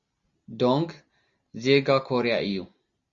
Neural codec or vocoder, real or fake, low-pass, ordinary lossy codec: none; real; 7.2 kHz; Opus, 64 kbps